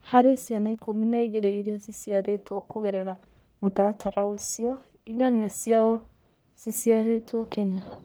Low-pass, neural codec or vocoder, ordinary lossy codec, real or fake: none; codec, 44.1 kHz, 1.7 kbps, Pupu-Codec; none; fake